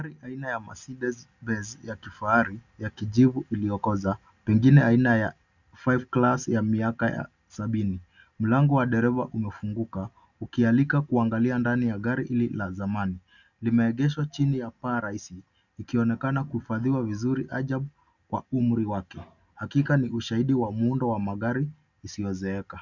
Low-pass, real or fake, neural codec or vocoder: 7.2 kHz; real; none